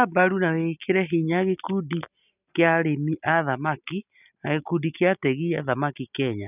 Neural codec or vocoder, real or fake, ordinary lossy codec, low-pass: none; real; none; 3.6 kHz